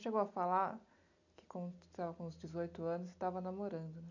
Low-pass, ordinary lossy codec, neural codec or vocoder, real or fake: 7.2 kHz; none; none; real